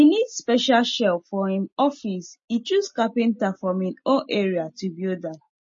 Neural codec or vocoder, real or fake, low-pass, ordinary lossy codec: none; real; 7.2 kHz; MP3, 32 kbps